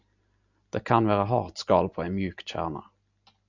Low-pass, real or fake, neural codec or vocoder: 7.2 kHz; real; none